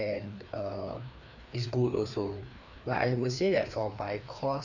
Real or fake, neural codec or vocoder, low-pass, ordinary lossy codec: fake; codec, 16 kHz, 2 kbps, FreqCodec, larger model; 7.2 kHz; none